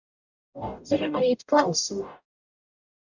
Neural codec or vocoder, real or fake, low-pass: codec, 44.1 kHz, 0.9 kbps, DAC; fake; 7.2 kHz